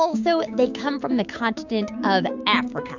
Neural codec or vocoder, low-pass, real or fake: codec, 16 kHz, 6 kbps, DAC; 7.2 kHz; fake